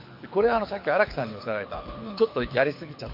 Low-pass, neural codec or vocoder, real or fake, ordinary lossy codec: 5.4 kHz; codec, 24 kHz, 6 kbps, HILCodec; fake; MP3, 32 kbps